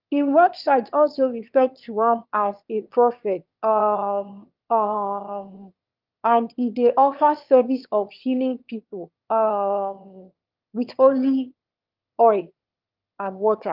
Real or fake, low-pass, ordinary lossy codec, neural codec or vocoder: fake; 5.4 kHz; Opus, 24 kbps; autoencoder, 22.05 kHz, a latent of 192 numbers a frame, VITS, trained on one speaker